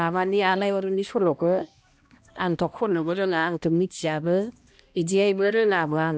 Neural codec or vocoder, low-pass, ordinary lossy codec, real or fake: codec, 16 kHz, 1 kbps, X-Codec, HuBERT features, trained on balanced general audio; none; none; fake